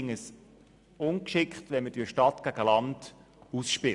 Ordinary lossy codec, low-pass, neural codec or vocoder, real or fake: none; 10.8 kHz; none; real